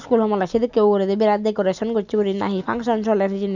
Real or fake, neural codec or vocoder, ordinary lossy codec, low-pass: real; none; none; 7.2 kHz